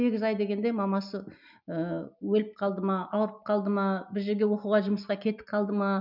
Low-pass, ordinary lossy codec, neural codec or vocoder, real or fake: 5.4 kHz; none; none; real